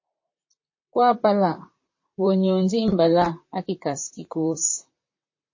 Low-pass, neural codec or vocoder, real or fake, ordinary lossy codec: 7.2 kHz; vocoder, 44.1 kHz, 128 mel bands, Pupu-Vocoder; fake; MP3, 32 kbps